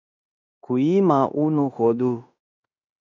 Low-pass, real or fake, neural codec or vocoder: 7.2 kHz; fake; codec, 16 kHz in and 24 kHz out, 0.9 kbps, LongCat-Audio-Codec, fine tuned four codebook decoder